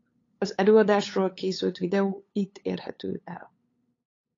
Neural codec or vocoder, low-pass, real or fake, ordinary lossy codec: codec, 16 kHz, 8 kbps, FunCodec, trained on LibriTTS, 25 frames a second; 7.2 kHz; fake; AAC, 32 kbps